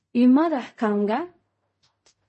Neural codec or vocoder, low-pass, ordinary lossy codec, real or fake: codec, 16 kHz in and 24 kHz out, 0.4 kbps, LongCat-Audio-Codec, fine tuned four codebook decoder; 10.8 kHz; MP3, 32 kbps; fake